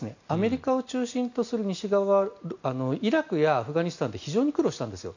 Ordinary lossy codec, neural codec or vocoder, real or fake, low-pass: none; none; real; 7.2 kHz